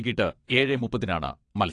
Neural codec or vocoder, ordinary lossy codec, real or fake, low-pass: vocoder, 22.05 kHz, 80 mel bands, WaveNeXt; AAC, 48 kbps; fake; 9.9 kHz